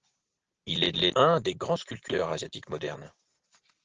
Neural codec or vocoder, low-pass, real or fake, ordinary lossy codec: none; 7.2 kHz; real; Opus, 16 kbps